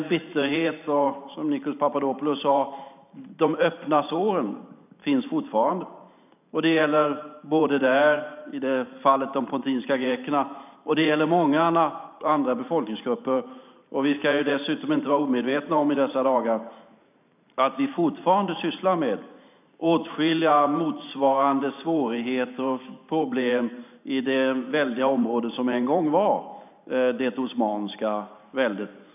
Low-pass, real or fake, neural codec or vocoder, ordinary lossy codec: 3.6 kHz; fake; vocoder, 44.1 kHz, 128 mel bands every 512 samples, BigVGAN v2; none